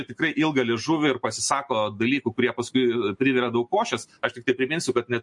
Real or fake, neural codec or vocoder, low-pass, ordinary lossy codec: real; none; 10.8 kHz; MP3, 48 kbps